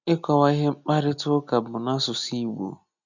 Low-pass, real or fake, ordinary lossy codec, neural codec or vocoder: 7.2 kHz; real; none; none